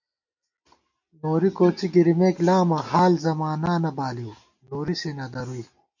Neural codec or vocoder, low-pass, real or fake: none; 7.2 kHz; real